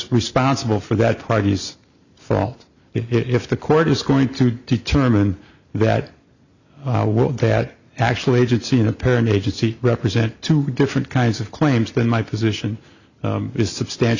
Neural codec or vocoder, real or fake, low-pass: none; real; 7.2 kHz